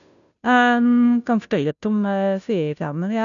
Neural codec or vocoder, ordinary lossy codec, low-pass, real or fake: codec, 16 kHz, 0.5 kbps, FunCodec, trained on Chinese and English, 25 frames a second; none; 7.2 kHz; fake